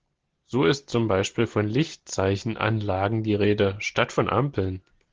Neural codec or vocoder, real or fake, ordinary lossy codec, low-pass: none; real; Opus, 16 kbps; 7.2 kHz